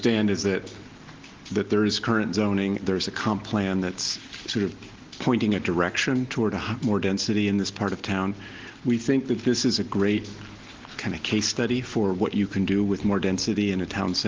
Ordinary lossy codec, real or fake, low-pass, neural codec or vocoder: Opus, 16 kbps; real; 7.2 kHz; none